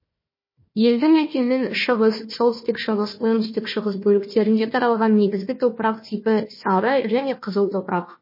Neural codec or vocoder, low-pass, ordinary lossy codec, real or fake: codec, 16 kHz, 1 kbps, FunCodec, trained on Chinese and English, 50 frames a second; 5.4 kHz; MP3, 24 kbps; fake